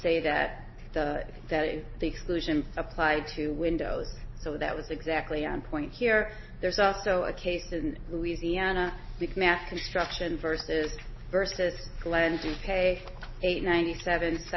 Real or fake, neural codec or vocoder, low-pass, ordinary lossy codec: real; none; 7.2 kHz; MP3, 24 kbps